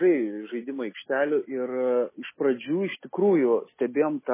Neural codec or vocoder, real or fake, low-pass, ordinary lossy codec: none; real; 3.6 kHz; MP3, 16 kbps